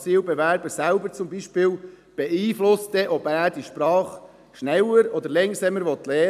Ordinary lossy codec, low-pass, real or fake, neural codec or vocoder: none; 14.4 kHz; real; none